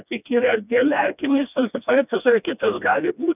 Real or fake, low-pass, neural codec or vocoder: fake; 3.6 kHz; codec, 16 kHz, 1 kbps, FreqCodec, smaller model